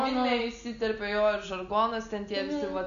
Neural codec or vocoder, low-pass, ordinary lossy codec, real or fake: none; 7.2 kHz; MP3, 96 kbps; real